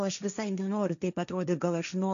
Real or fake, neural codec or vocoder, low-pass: fake; codec, 16 kHz, 1.1 kbps, Voila-Tokenizer; 7.2 kHz